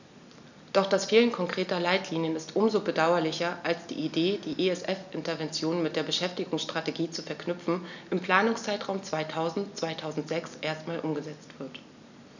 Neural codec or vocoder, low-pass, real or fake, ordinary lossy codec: none; 7.2 kHz; real; none